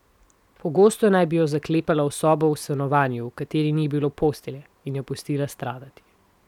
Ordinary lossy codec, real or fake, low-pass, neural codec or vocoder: none; real; 19.8 kHz; none